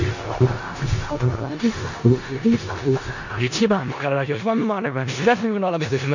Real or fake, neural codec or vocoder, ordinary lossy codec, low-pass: fake; codec, 16 kHz in and 24 kHz out, 0.4 kbps, LongCat-Audio-Codec, four codebook decoder; none; 7.2 kHz